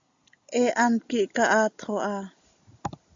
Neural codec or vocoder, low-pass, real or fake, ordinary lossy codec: none; 7.2 kHz; real; AAC, 64 kbps